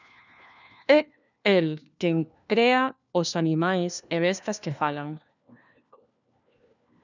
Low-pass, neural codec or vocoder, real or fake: 7.2 kHz; codec, 16 kHz, 1 kbps, FunCodec, trained on LibriTTS, 50 frames a second; fake